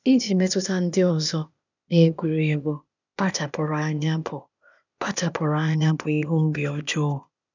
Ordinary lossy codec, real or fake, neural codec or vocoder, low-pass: none; fake; codec, 16 kHz, 0.8 kbps, ZipCodec; 7.2 kHz